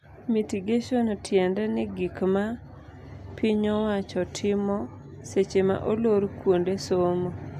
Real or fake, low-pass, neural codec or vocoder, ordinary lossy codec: real; 14.4 kHz; none; AAC, 96 kbps